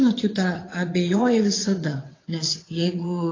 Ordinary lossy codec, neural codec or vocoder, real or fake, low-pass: AAC, 32 kbps; vocoder, 22.05 kHz, 80 mel bands, WaveNeXt; fake; 7.2 kHz